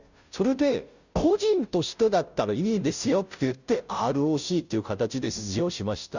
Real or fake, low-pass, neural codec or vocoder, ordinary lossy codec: fake; 7.2 kHz; codec, 16 kHz, 0.5 kbps, FunCodec, trained on Chinese and English, 25 frames a second; none